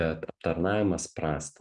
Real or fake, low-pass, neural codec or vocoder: real; 10.8 kHz; none